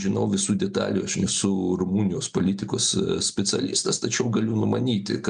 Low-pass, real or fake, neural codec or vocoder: 9.9 kHz; real; none